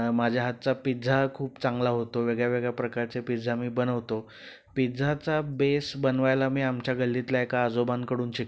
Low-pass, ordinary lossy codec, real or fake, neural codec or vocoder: none; none; real; none